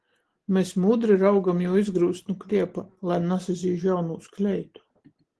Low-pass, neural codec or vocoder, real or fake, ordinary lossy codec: 10.8 kHz; none; real; Opus, 16 kbps